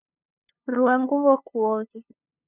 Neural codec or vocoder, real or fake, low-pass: codec, 16 kHz, 2 kbps, FunCodec, trained on LibriTTS, 25 frames a second; fake; 3.6 kHz